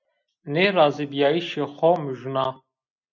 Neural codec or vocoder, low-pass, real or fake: none; 7.2 kHz; real